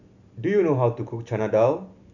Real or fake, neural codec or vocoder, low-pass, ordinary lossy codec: real; none; 7.2 kHz; none